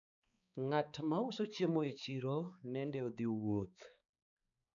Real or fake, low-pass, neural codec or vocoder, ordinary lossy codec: fake; 7.2 kHz; codec, 16 kHz, 4 kbps, X-Codec, HuBERT features, trained on balanced general audio; none